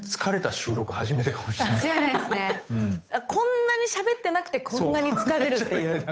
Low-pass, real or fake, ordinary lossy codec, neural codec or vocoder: none; fake; none; codec, 16 kHz, 8 kbps, FunCodec, trained on Chinese and English, 25 frames a second